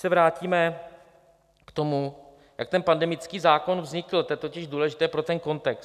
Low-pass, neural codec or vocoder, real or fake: 14.4 kHz; none; real